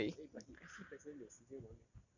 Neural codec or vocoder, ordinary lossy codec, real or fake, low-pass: none; none; real; 7.2 kHz